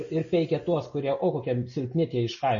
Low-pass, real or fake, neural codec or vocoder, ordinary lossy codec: 7.2 kHz; real; none; MP3, 32 kbps